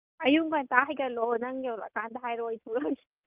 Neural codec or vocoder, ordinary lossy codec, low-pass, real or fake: none; Opus, 24 kbps; 3.6 kHz; real